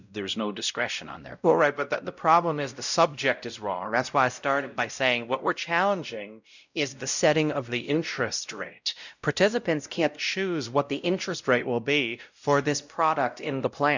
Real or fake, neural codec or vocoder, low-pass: fake; codec, 16 kHz, 0.5 kbps, X-Codec, WavLM features, trained on Multilingual LibriSpeech; 7.2 kHz